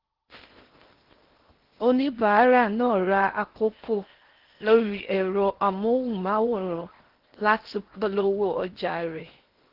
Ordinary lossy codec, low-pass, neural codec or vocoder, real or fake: Opus, 16 kbps; 5.4 kHz; codec, 16 kHz in and 24 kHz out, 0.8 kbps, FocalCodec, streaming, 65536 codes; fake